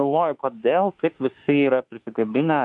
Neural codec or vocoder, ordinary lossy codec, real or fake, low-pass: autoencoder, 48 kHz, 32 numbers a frame, DAC-VAE, trained on Japanese speech; MP3, 64 kbps; fake; 10.8 kHz